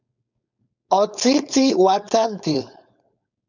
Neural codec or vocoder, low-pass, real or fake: codec, 16 kHz, 4.8 kbps, FACodec; 7.2 kHz; fake